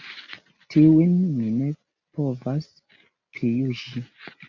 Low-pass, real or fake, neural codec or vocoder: 7.2 kHz; real; none